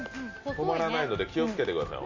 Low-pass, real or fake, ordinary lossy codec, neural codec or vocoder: 7.2 kHz; real; AAC, 48 kbps; none